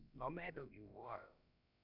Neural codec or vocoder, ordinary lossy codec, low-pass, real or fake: codec, 16 kHz, about 1 kbps, DyCAST, with the encoder's durations; none; 5.4 kHz; fake